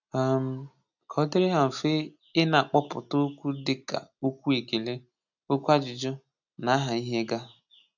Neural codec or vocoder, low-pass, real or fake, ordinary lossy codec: none; 7.2 kHz; real; none